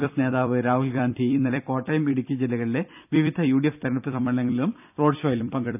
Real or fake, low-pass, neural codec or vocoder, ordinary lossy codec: fake; 3.6 kHz; vocoder, 44.1 kHz, 128 mel bands every 256 samples, BigVGAN v2; none